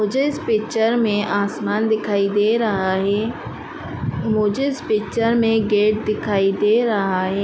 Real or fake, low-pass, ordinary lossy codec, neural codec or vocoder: real; none; none; none